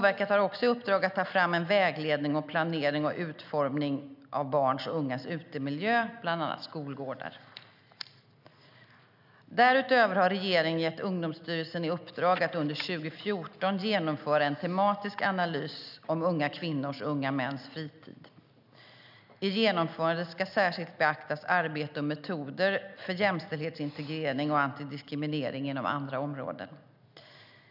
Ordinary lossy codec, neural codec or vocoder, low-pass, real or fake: none; none; 5.4 kHz; real